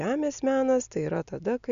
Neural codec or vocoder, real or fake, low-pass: none; real; 7.2 kHz